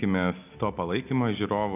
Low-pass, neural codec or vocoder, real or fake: 3.6 kHz; none; real